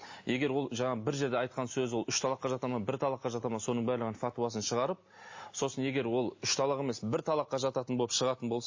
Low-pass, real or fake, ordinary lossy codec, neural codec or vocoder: 7.2 kHz; real; MP3, 32 kbps; none